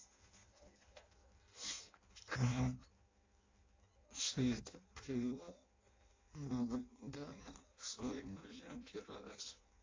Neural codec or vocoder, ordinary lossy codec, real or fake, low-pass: codec, 16 kHz in and 24 kHz out, 0.6 kbps, FireRedTTS-2 codec; AAC, 32 kbps; fake; 7.2 kHz